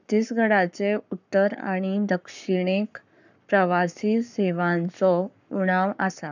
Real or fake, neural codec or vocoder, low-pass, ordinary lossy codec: fake; codec, 44.1 kHz, 7.8 kbps, Pupu-Codec; 7.2 kHz; none